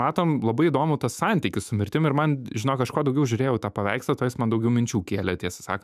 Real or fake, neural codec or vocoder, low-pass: fake; autoencoder, 48 kHz, 128 numbers a frame, DAC-VAE, trained on Japanese speech; 14.4 kHz